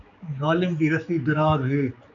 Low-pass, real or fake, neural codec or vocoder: 7.2 kHz; fake; codec, 16 kHz, 4 kbps, X-Codec, HuBERT features, trained on general audio